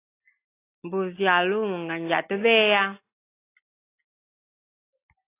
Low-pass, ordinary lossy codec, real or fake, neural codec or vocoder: 3.6 kHz; AAC, 24 kbps; real; none